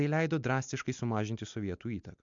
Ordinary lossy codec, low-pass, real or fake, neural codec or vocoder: MP3, 64 kbps; 7.2 kHz; real; none